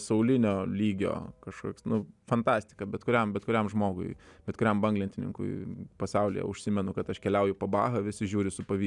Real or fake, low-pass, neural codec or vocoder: real; 10.8 kHz; none